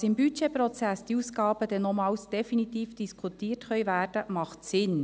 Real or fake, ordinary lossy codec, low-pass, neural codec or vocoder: real; none; none; none